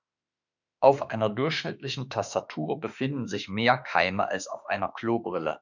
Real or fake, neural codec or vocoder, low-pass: fake; autoencoder, 48 kHz, 32 numbers a frame, DAC-VAE, trained on Japanese speech; 7.2 kHz